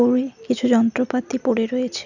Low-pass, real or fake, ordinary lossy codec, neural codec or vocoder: 7.2 kHz; real; none; none